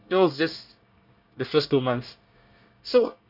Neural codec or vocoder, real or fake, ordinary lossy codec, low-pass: codec, 24 kHz, 1 kbps, SNAC; fake; none; 5.4 kHz